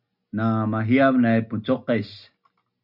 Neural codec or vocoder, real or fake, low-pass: none; real; 5.4 kHz